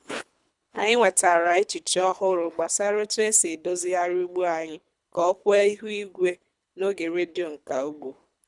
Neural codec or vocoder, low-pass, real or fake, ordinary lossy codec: codec, 24 kHz, 3 kbps, HILCodec; 10.8 kHz; fake; none